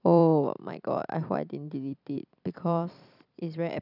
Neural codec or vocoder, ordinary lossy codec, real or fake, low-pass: vocoder, 44.1 kHz, 128 mel bands every 256 samples, BigVGAN v2; none; fake; 5.4 kHz